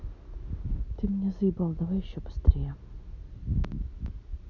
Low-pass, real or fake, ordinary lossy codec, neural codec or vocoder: 7.2 kHz; real; none; none